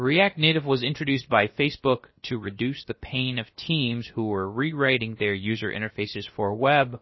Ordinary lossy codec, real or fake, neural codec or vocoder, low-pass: MP3, 24 kbps; fake; codec, 16 kHz, about 1 kbps, DyCAST, with the encoder's durations; 7.2 kHz